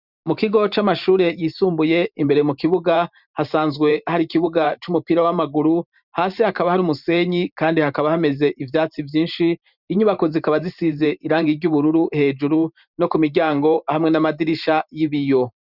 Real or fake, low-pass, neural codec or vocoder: fake; 5.4 kHz; vocoder, 44.1 kHz, 128 mel bands every 512 samples, BigVGAN v2